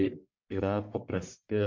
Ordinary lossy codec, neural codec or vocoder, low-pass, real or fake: MP3, 48 kbps; codec, 44.1 kHz, 1.7 kbps, Pupu-Codec; 7.2 kHz; fake